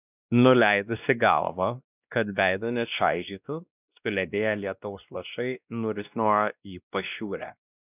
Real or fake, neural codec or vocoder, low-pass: fake; codec, 16 kHz, 1 kbps, X-Codec, WavLM features, trained on Multilingual LibriSpeech; 3.6 kHz